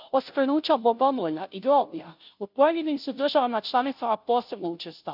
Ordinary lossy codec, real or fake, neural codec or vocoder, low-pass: none; fake; codec, 16 kHz, 0.5 kbps, FunCodec, trained on Chinese and English, 25 frames a second; 5.4 kHz